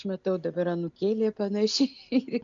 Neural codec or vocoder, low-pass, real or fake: none; 7.2 kHz; real